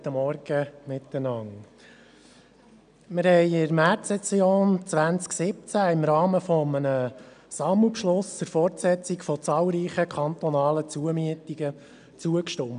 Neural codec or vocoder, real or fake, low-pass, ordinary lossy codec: none; real; 9.9 kHz; none